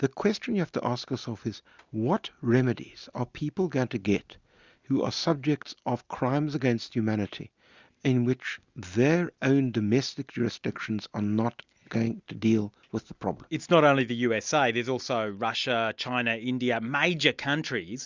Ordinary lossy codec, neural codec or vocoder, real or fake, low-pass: Opus, 64 kbps; none; real; 7.2 kHz